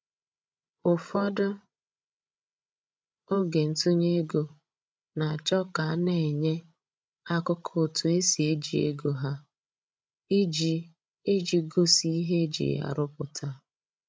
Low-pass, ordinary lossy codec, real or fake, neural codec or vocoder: none; none; fake; codec, 16 kHz, 16 kbps, FreqCodec, larger model